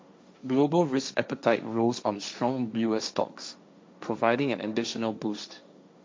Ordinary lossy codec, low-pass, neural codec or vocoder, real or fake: none; none; codec, 16 kHz, 1.1 kbps, Voila-Tokenizer; fake